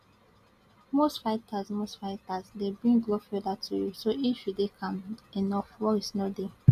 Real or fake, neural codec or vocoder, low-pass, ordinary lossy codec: real; none; 14.4 kHz; none